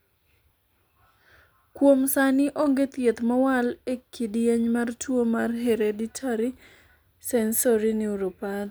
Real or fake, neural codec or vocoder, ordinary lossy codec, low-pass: real; none; none; none